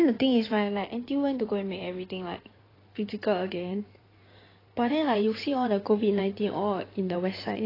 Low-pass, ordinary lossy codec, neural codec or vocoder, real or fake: 5.4 kHz; AAC, 24 kbps; codec, 16 kHz in and 24 kHz out, 2.2 kbps, FireRedTTS-2 codec; fake